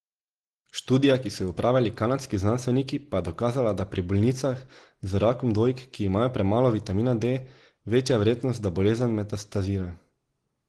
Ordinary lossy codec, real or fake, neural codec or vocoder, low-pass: Opus, 16 kbps; real; none; 10.8 kHz